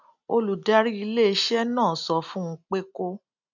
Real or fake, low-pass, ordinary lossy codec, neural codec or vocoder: real; 7.2 kHz; none; none